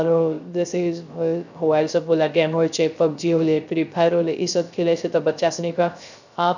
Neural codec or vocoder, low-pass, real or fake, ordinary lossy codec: codec, 16 kHz, 0.3 kbps, FocalCodec; 7.2 kHz; fake; none